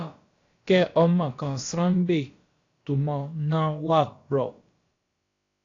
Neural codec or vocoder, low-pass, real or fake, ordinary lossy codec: codec, 16 kHz, about 1 kbps, DyCAST, with the encoder's durations; 7.2 kHz; fake; AAC, 48 kbps